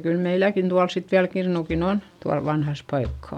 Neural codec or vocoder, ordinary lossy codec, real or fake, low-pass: none; none; real; 19.8 kHz